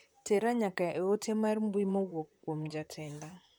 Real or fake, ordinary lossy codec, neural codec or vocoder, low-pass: fake; MP3, 96 kbps; vocoder, 44.1 kHz, 128 mel bands, Pupu-Vocoder; 19.8 kHz